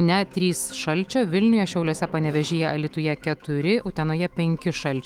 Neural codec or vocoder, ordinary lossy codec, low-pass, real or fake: autoencoder, 48 kHz, 128 numbers a frame, DAC-VAE, trained on Japanese speech; Opus, 24 kbps; 19.8 kHz; fake